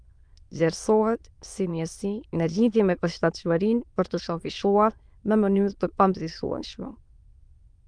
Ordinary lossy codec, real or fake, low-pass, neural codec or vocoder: Opus, 32 kbps; fake; 9.9 kHz; autoencoder, 22.05 kHz, a latent of 192 numbers a frame, VITS, trained on many speakers